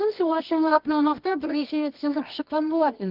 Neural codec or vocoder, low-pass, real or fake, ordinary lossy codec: codec, 24 kHz, 0.9 kbps, WavTokenizer, medium music audio release; 5.4 kHz; fake; Opus, 24 kbps